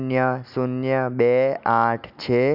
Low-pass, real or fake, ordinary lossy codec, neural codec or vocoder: 5.4 kHz; real; none; none